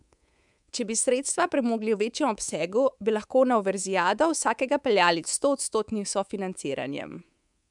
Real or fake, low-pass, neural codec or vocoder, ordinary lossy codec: fake; 10.8 kHz; codec, 24 kHz, 3.1 kbps, DualCodec; MP3, 96 kbps